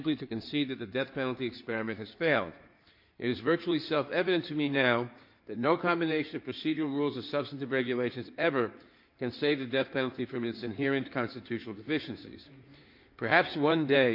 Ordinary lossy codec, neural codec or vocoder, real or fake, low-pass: MP3, 32 kbps; codec, 16 kHz in and 24 kHz out, 2.2 kbps, FireRedTTS-2 codec; fake; 5.4 kHz